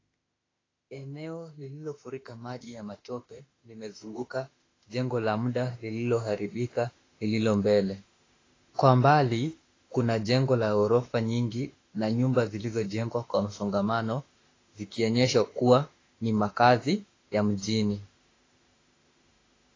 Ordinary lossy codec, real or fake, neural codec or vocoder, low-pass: AAC, 32 kbps; fake; autoencoder, 48 kHz, 32 numbers a frame, DAC-VAE, trained on Japanese speech; 7.2 kHz